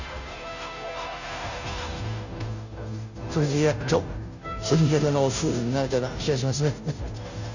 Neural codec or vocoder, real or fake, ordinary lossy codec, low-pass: codec, 16 kHz, 0.5 kbps, FunCodec, trained on Chinese and English, 25 frames a second; fake; none; 7.2 kHz